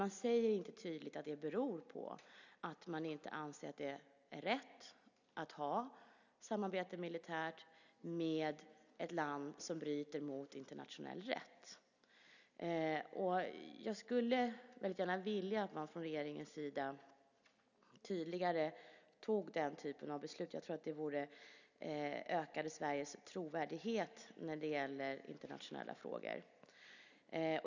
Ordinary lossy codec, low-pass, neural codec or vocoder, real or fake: none; 7.2 kHz; none; real